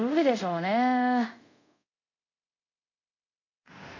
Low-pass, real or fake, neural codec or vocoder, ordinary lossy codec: 7.2 kHz; fake; codec, 24 kHz, 0.5 kbps, DualCodec; none